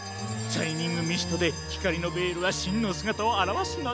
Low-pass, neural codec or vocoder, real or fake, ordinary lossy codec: none; none; real; none